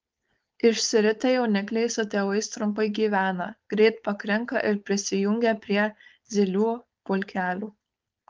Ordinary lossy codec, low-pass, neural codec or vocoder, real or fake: Opus, 24 kbps; 7.2 kHz; codec, 16 kHz, 4.8 kbps, FACodec; fake